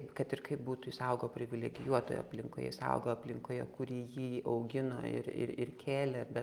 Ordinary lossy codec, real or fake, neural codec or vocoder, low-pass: Opus, 32 kbps; real; none; 19.8 kHz